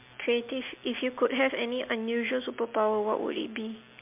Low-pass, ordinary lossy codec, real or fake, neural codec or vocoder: 3.6 kHz; MP3, 32 kbps; real; none